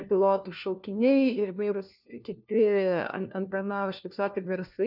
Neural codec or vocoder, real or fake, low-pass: codec, 16 kHz, 1 kbps, FunCodec, trained on LibriTTS, 50 frames a second; fake; 5.4 kHz